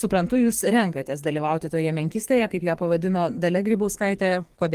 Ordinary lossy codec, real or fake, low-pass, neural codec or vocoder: Opus, 24 kbps; fake; 14.4 kHz; codec, 44.1 kHz, 2.6 kbps, SNAC